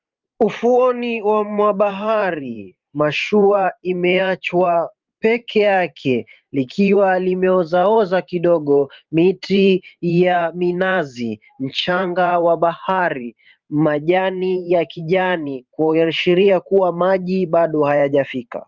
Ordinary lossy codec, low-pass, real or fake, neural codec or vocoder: Opus, 32 kbps; 7.2 kHz; fake; vocoder, 44.1 kHz, 128 mel bands every 512 samples, BigVGAN v2